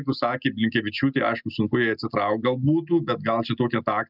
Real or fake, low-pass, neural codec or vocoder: real; 5.4 kHz; none